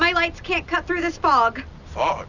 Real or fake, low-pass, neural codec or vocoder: real; 7.2 kHz; none